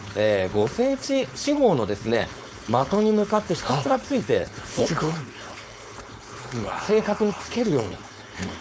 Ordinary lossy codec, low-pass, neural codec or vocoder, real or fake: none; none; codec, 16 kHz, 4.8 kbps, FACodec; fake